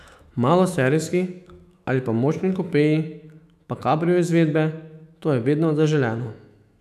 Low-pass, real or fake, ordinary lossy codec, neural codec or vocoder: 14.4 kHz; fake; none; autoencoder, 48 kHz, 128 numbers a frame, DAC-VAE, trained on Japanese speech